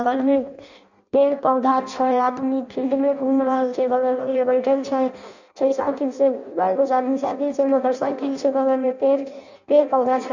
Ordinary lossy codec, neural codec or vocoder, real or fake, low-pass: none; codec, 16 kHz in and 24 kHz out, 0.6 kbps, FireRedTTS-2 codec; fake; 7.2 kHz